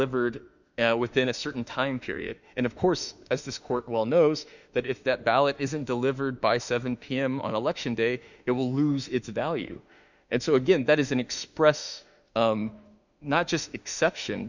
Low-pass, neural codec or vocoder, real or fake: 7.2 kHz; autoencoder, 48 kHz, 32 numbers a frame, DAC-VAE, trained on Japanese speech; fake